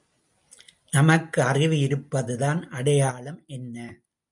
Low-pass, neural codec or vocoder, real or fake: 10.8 kHz; none; real